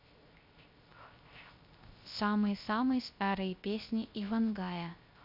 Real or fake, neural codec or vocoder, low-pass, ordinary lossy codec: fake; codec, 16 kHz, 0.3 kbps, FocalCodec; 5.4 kHz; MP3, 48 kbps